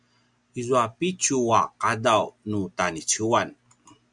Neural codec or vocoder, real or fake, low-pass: none; real; 10.8 kHz